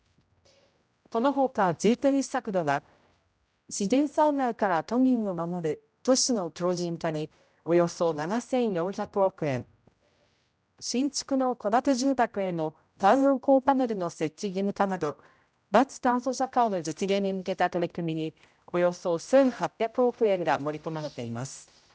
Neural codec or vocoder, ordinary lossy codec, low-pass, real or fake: codec, 16 kHz, 0.5 kbps, X-Codec, HuBERT features, trained on general audio; none; none; fake